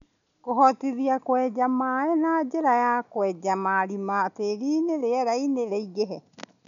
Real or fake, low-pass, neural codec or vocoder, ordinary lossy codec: real; 7.2 kHz; none; none